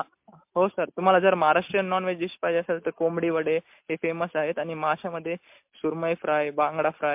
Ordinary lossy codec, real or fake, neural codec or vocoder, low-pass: MP3, 32 kbps; real; none; 3.6 kHz